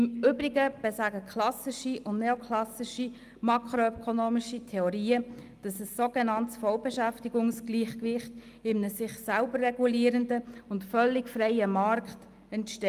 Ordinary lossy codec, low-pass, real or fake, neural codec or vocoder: Opus, 32 kbps; 14.4 kHz; real; none